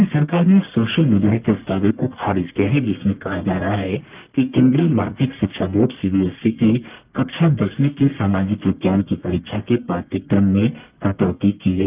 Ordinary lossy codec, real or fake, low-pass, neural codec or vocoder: Opus, 24 kbps; fake; 3.6 kHz; codec, 44.1 kHz, 1.7 kbps, Pupu-Codec